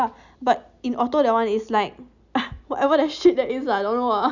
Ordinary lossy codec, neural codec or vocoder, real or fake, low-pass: none; none; real; 7.2 kHz